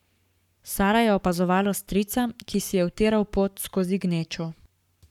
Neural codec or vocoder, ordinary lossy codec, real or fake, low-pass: codec, 44.1 kHz, 7.8 kbps, Pupu-Codec; none; fake; 19.8 kHz